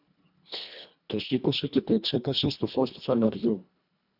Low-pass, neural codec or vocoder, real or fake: 5.4 kHz; codec, 24 kHz, 1.5 kbps, HILCodec; fake